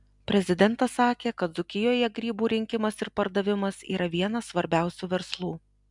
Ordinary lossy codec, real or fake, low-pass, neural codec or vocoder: MP3, 96 kbps; real; 10.8 kHz; none